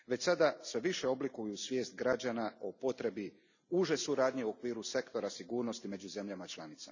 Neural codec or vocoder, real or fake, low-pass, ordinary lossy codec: none; real; 7.2 kHz; none